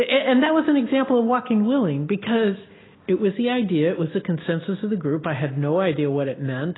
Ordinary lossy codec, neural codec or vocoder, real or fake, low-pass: AAC, 16 kbps; none; real; 7.2 kHz